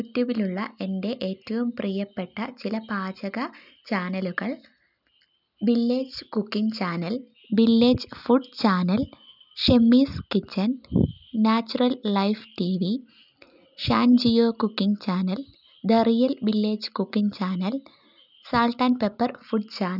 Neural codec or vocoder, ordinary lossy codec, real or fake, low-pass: none; none; real; 5.4 kHz